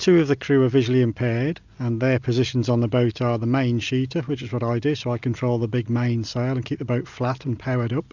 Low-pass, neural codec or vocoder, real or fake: 7.2 kHz; none; real